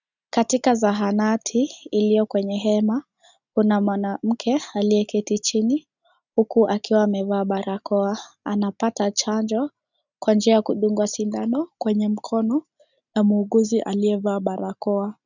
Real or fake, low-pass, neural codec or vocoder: real; 7.2 kHz; none